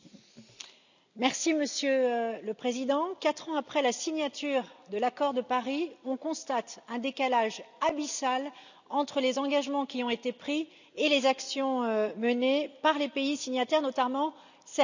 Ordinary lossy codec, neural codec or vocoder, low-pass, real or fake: none; none; 7.2 kHz; real